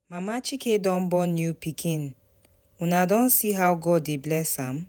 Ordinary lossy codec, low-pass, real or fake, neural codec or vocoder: none; none; fake; vocoder, 48 kHz, 128 mel bands, Vocos